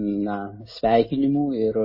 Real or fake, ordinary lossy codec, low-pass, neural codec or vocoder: real; MP3, 24 kbps; 5.4 kHz; none